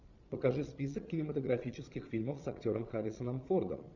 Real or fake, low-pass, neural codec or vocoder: fake; 7.2 kHz; vocoder, 22.05 kHz, 80 mel bands, Vocos